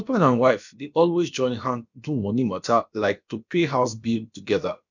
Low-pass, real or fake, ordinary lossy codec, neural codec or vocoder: 7.2 kHz; fake; none; codec, 16 kHz, about 1 kbps, DyCAST, with the encoder's durations